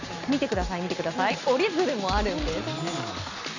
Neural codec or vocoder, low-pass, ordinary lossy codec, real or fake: none; 7.2 kHz; none; real